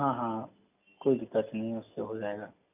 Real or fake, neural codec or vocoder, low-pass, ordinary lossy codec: real; none; 3.6 kHz; none